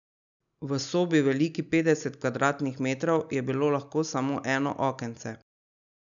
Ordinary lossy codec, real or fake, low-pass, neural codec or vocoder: none; real; 7.2 kHz; none